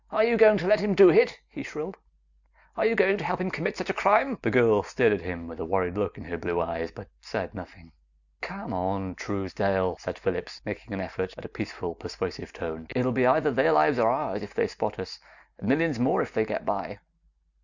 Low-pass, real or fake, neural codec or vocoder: 7.2 kHz; real; none